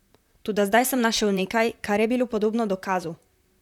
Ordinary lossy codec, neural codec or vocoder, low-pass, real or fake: none; vocoder, 44.1 kHz, 128 mel bands, Pupu-Vocoder; 19.8 kHz; fake